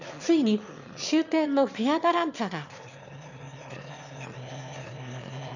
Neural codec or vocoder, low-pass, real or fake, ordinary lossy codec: autoencoder, 22.05 kHz, a latent of 192 numbers a frame, VITS, trained on one speaker; 7.2 kHz; fake; none